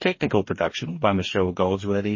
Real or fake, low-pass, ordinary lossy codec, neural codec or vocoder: fake; 7.2 kHz; MP3, 32 kbps; codec, 44.1 kHz, 2.6 kbps, DAC